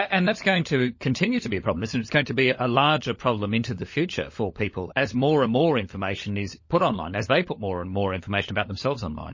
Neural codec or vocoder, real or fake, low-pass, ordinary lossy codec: codec, 16 kHz, 16 kbps, FunCodec, trained on LibriTTS, 50 frames a second; fake; 7.2 kHz; MP3, 32 kbps